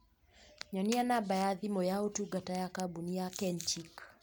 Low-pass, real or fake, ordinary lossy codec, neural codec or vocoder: none; real; none; none